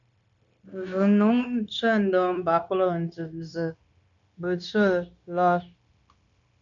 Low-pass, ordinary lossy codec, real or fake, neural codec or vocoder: 7.2 kHz; MP3, 96 kbps; fake; codec, 16 kHz, 0.9 kbps, LongCat-Audio-Codec